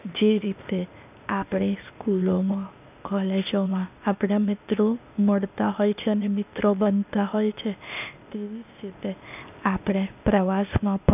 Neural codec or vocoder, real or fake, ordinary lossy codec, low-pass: codec, 16 kHz, 0.8 kbps, ZipCodec; fake; none; 3.6 kHz